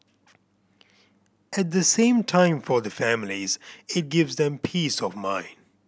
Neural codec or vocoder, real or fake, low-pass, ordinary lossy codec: none; real; none; none